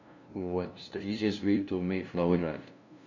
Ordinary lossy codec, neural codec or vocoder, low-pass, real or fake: none; codec, 16 kHz, 0.5 kbps, FunCodec, trained on LibriTTS, 25 frames a second; 7.2 kHz; fake